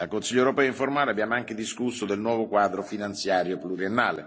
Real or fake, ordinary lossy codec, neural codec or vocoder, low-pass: real; none; none; none